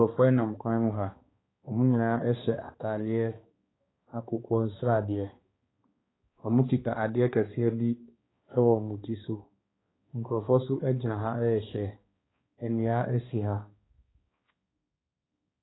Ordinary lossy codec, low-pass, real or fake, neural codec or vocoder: AAC, 16 kbps; 7.2 kHz; fake; codec, 16 kHz, 2 kbps, X-Codec, HuBERT features, trained on balanced general audio